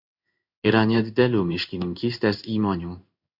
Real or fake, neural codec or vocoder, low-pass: fake; codec, 16 kHz in and 24 kHz out, 1 kbps, XY-Tokenizer; 5.4 kHz